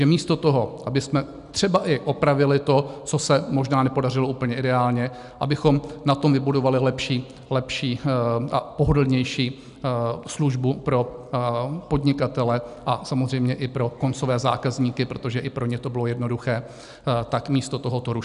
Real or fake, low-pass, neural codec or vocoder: real; 10.8 kHz; none